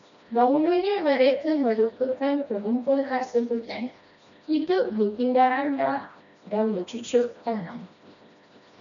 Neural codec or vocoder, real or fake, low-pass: codec, 16 kHz, 1 kbps, FreqCodec, smaller model; fake; 7.2 kHz